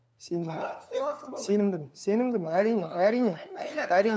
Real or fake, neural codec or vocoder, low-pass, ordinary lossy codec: fake; codec, 16 kHz, 2 kbps, FunCodec, trained on LibriTTS, 25 frames a second; none; none